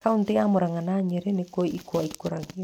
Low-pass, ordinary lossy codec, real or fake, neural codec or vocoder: 19.8 kHz; none; real; none